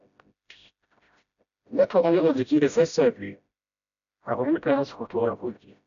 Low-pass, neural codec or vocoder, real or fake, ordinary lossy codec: 7.2 kHz; codec, 16 kHz, 0.5 kbps, FreqCodec, smaller model; fake; none